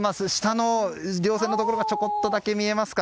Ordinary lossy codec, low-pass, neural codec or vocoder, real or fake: none; none; none; real